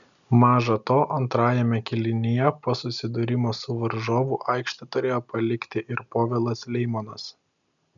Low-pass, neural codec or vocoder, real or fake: 7.2 kHz; none; real